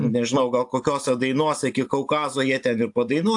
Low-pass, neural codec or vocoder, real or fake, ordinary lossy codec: 10.8 kHz; none; real; AAC, 64 kbps